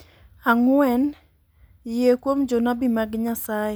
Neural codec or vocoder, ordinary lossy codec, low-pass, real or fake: none; none; none; real